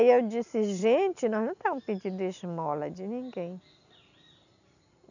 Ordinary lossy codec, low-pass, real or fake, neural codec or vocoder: none; 7.2 kHz; real; none